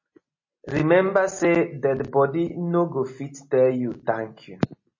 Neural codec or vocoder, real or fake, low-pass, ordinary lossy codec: none; real; 7.2 kHz; MP3, 32 kbps